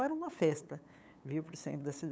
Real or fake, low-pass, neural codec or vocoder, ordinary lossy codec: fake; none; codec, 16 kHz, 8 kbps, FunCodec, trained on LibriTTS, 25 frames a second; none